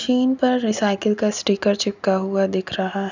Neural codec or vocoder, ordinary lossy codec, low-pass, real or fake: none; none; 7.2 kHz; real